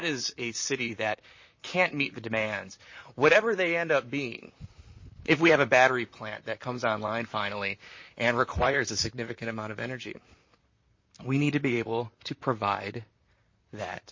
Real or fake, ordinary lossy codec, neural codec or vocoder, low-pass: fake; MP3, 32 kbps; vocoder, 44.1 kHz, 128 mel bands, Pupu-Vocoder; 7.2 kHz